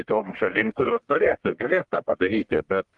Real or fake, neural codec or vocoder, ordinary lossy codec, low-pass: fake; codec, 44.1 kHz, 1.7 kbps, Pupu-Codec; Opus, 24 kbps; 10.8 kHz